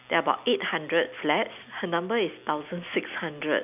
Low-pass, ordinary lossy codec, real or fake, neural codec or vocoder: 3.6 kHz; none; real; none